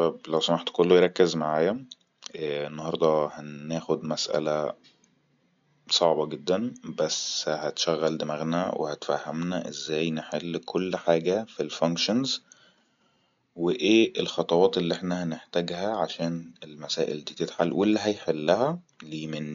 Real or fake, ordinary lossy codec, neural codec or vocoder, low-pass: real; MP3, 64 kbps; none; 7.2 kHz